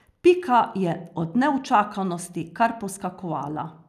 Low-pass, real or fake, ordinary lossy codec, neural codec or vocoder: 14.4 kHz; real; none; none